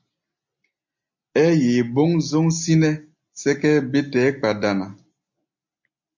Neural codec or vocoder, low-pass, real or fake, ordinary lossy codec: none; 7.2 kHz; real; MP3, 64 kbps